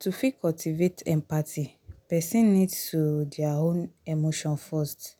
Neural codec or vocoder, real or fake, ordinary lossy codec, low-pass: none; real; none; none